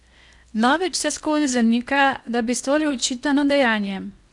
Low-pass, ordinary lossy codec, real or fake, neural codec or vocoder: 10.8 kHz; none; fake; codec, 16 kHz in and 24 kHz out, 0.8 kbps, FocalCodec, streaming, 65536 codes